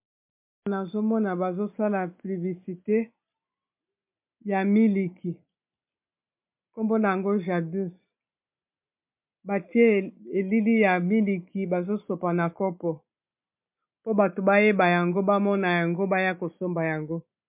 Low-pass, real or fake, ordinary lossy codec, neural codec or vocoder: 3.6 kHz; real; MP3, 32 kbps; none